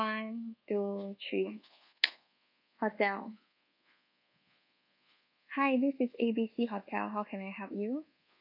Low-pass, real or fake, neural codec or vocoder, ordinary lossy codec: 5.4 kHz; fake; codec, 24 kHz, 1.2 kbps, DualCodec; none